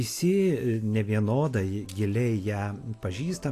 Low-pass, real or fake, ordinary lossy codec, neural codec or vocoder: 14.4 kHz; real; AAC, 64 kbps; none